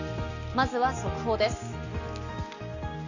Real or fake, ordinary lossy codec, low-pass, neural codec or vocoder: real; none; 7.2 kHz; none